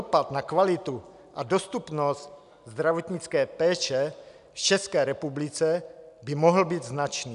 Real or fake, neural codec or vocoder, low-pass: real; none; 10.8 kHz